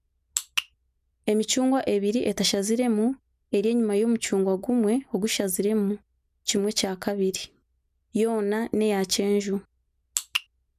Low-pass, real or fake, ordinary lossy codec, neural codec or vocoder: 14.4 kHz; real; none; none